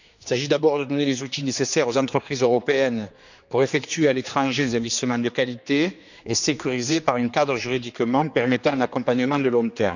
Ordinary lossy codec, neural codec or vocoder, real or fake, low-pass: none; codec, 16 kHz, 2 kbps, X-Codec, HuBERT features, trained on general audio; fake; 7.2 kHz